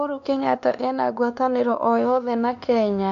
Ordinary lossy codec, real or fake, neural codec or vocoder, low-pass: MP3, 64 kbps; fake; codec, 16 kHz, 2 kbps, X-Codec, WavLM features, trained on Multilingual LibriSpeech; 7.2 kHz